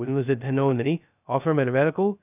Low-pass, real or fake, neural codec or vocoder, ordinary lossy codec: 3.6 kHz; fake; codec, 16 kHz, 0.2 kbps, FocalCodec; none